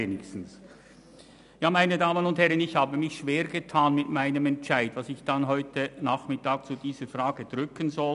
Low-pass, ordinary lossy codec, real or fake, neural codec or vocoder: 10.8 kHz; none; real; none